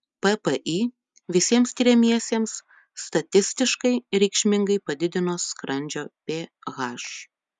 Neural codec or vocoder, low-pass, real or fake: none; 10.8 kHz; real